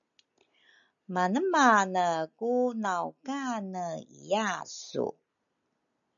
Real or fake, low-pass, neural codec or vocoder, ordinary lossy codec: real; 7.2 kHz; none; MP3, 96 kbps